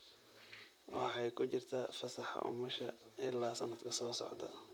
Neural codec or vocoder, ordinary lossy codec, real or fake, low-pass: vocoder, 44.1 kHz, 128 mel bands, Pupu-Vocoder; none; fake; 19.8 kHz